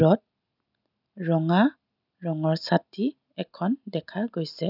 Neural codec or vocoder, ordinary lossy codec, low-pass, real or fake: none; none; 5.4 kHz; real